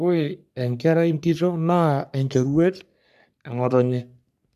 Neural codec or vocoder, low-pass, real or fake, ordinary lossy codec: codec, 44.1 kHz, 3.4 kbps, Pupu-Codec; 14.4 kHz; fake; none